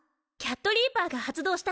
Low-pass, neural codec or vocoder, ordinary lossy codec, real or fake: none; none; none; real